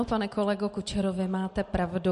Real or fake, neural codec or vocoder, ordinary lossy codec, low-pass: real; none; MP3, 48 kbps; 14.4 kHz